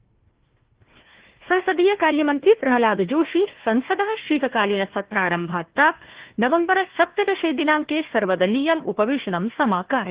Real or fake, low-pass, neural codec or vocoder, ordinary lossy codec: fake; 3.6 kHz; codec, 16 kHz, 1 kbps, FunCodec, trained on Chinese and English, 50 frames a second; Opus, 16 kbps